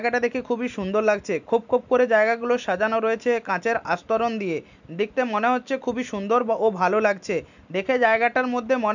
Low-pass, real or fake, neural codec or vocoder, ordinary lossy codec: 7.2 kHz; real; none; none